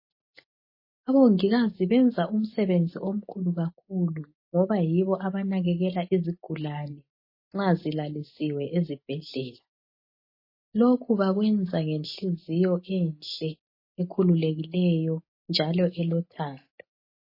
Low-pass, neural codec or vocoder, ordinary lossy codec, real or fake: 5.4 kHz; none; MP3, 24 kbps; real